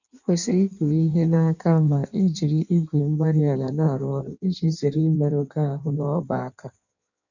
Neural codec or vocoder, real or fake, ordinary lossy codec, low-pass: codec, 16 kHz in and 24 kHz out, 1.1 kbps, FireRedTTS-2 codec; fake; none; 7.2 kHz